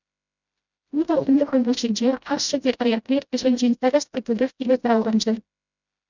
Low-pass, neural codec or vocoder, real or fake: 7.2 kHz; codec, 16 kHz, 0.5 kbps, FreqCodec, smaller model; fake